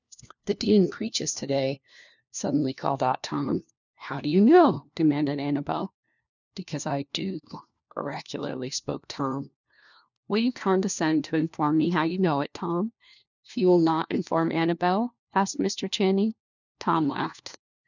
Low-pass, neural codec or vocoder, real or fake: 7.2 kHz; codec, 16 kHz, 1 kbps, FunCodec, trained on LibriTTS, 50 frames a second; fake